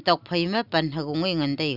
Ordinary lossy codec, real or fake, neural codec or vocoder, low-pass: none; real; none; 5.4 kHz